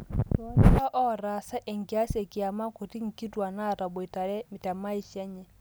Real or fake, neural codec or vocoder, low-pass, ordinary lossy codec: real; none; none; none